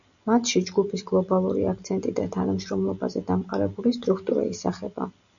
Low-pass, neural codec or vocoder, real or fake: 7.2 kHz; none; real